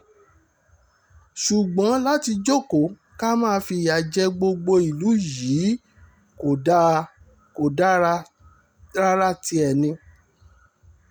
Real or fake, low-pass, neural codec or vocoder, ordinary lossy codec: real; none; none; none